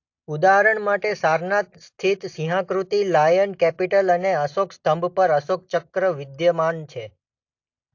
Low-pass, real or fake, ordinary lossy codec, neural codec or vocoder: 7.2 kHz; real; AAC, 48 kbps; none